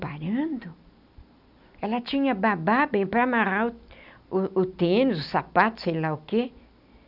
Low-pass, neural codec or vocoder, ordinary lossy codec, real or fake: 5.4 kHz; none; none; real